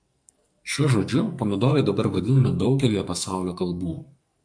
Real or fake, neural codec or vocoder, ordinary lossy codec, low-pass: fake; codec, 44.1 kHz, 2.6 kbps, SNAC; MP3, 64 kbps; 9.9 kHz